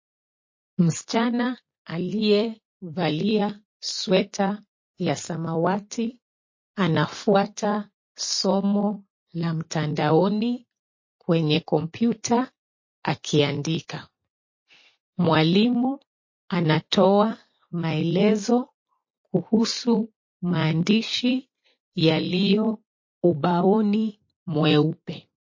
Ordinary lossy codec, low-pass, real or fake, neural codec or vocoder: MP3, 32 kbps; 7.2 kHz; fake; vocoder, 44.1 kHz, 128 mel bands every 256 samples, BigVGAN v2